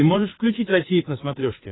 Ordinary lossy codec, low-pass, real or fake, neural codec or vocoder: AAC, 16 kbps; 7.2 kHz; fake; codec, 16 kHz, 16 kbps, FreqCodec, larger model